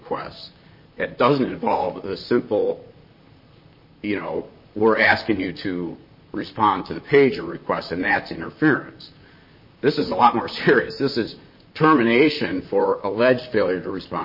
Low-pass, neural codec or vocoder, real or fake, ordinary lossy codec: 5.4 kHz; vocoder, 44.1 kHz, 128 mel bands, Pupu-Vocoder; fake; MP3, 24 kbps